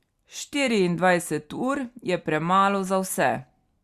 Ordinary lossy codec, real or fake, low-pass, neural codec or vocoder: Opus, 64 kbps; real; 14.4 kHz; none